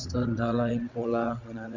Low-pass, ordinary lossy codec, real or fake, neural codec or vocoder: 7.2 kHz; none; fake; vocoder, 22.05 kHz, 80 mel bands, WaveNeXt